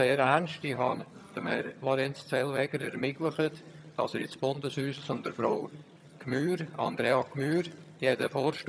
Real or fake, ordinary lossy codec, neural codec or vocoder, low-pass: fake; none; vocoder, 22.05 kHz, 80 mel bands, HiFi-GAN; none